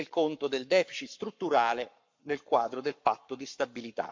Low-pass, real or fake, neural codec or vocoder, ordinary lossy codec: 7.2 kHz; fake; codec, 44.1 kHz, 7.8 kbps, Pupu-Codec; MP3, 64 kbps